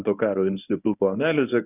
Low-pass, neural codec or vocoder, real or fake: 3.6 kHz; codec, 24 kHz, 0.9 kbps, WavTokenizer, medium speech release version 1; fake